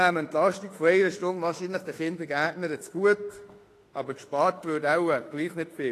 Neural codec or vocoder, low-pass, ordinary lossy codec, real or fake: autoencoder, 48 kHz, 32 numbers a frame, DAC-VAE, trained on Japanese speech; 14.4 kHz; AAC, 48 kbps; fake